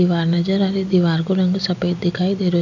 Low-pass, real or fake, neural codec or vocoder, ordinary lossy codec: 7.2 kHz; real; none; none